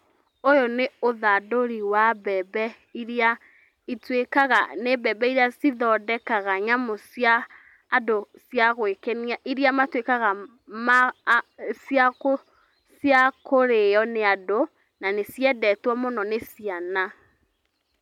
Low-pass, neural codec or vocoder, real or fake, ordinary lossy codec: 19.8 kHz; none; real; none